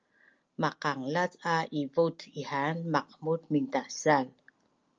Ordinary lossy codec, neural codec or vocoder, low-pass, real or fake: Opus, 24 kbps; none; 7.2 kHz; real